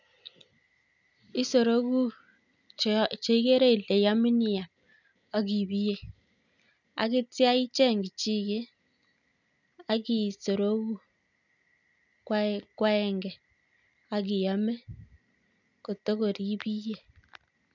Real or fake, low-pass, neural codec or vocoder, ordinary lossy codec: real; 7.2 kHz; none; none